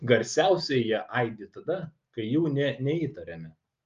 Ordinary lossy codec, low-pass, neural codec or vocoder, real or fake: Opus, 32 kbps; 7.2 kHz; none; real